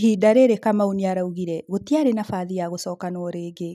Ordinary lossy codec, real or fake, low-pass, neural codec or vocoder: none; real; 14.4 kHz; none